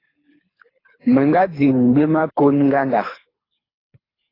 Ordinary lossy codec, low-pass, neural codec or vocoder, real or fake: AAC, 24 kbps; 5.4 kHz; codec, 24 kHz, 3 kbps, HILCodec; fake